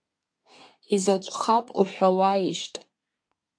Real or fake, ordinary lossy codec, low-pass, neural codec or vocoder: fake; AAC, 64 kbps; 9.9 kHz; codec, 24 kHz, 1 kbps, SNAC